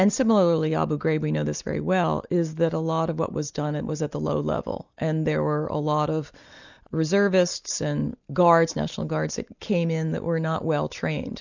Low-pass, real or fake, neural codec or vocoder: 7.2 kHz; real; none